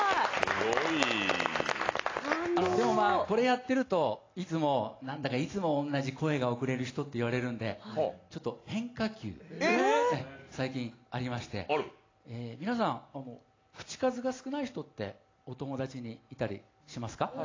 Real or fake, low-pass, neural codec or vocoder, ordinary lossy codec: real; 7.2 kHz; none; AAC, 32 kbps